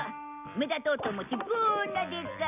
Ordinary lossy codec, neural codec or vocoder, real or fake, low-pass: none; none; real; 3.6 kHz